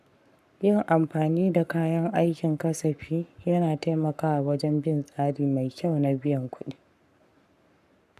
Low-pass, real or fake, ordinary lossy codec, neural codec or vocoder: 14.4 kHz; fake; none; codec, 44.1 kHz, 7.8 kbps, Pupu-Codec